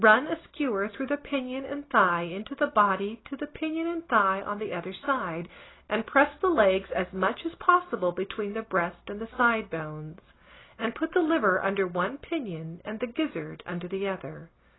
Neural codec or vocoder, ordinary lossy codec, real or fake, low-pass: none; AAC, 16 kbps; real; 7.2 kHz